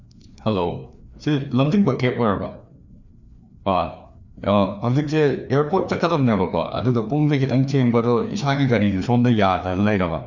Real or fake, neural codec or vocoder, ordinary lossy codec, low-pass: fake; codec, 16 kHz, 2 kbps, FreqCodec, larger model; none; 7.2 kHz